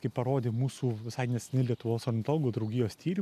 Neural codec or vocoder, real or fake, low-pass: none; real; 14.4 kHz